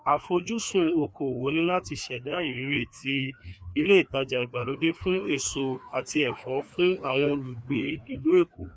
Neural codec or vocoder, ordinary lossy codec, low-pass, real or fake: codec, 16 kHz, 2 kbps, FreqCodec, larger model; none; none; fake